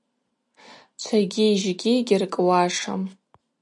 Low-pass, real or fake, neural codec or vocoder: 10.8 kHz; real; none